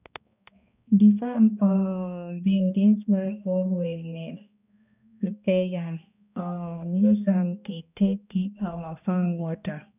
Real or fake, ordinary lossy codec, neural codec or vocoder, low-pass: fake; none; codec, 16 kHz, 1 kbps, X-Codec, HuBERT features, trained on balanced general audio; 3.6 kHz